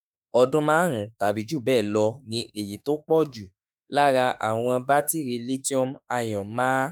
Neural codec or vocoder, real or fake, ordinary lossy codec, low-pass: autoencoder, 48 kHz, 32 numbers a frame, DAC-VAE, trained on Japanese speech; fake; none; none